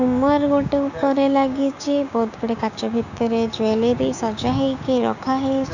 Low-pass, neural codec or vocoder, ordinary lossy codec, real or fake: 7.2 kHz; codec, 16 kHz, 6 kbps, DAC; none; fake